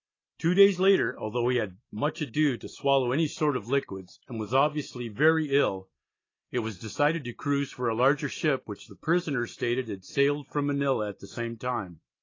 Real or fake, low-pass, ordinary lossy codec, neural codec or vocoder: real; 7.2 kHz; AAC, 32 kbps; none